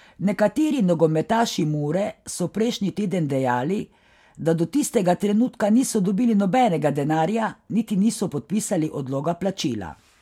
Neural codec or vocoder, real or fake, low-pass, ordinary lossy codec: none; real; 19.8 kHz; MP3, 96 kbps